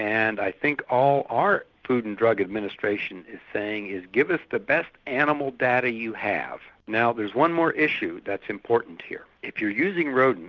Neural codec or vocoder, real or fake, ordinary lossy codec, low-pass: none; real; Opus, 32 kbps; 7.2 kHz